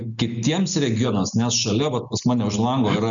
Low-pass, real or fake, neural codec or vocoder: 7.2 kHz; real; none